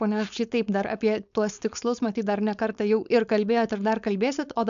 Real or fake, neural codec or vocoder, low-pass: fake; codec, 16 kHz, 4.8 kbps, FACodec; 7.2 kHz